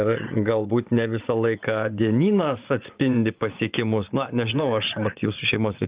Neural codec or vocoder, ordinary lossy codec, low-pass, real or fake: vocoder, 24 kHz, 100 mel bands, Vocos; Opus, 32 kbps; 3.6 kHz; fake